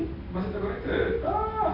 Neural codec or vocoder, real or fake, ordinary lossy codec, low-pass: none; real; none; 5.4 kHz